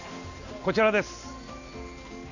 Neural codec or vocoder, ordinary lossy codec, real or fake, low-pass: none; none; real; 7.2 kHz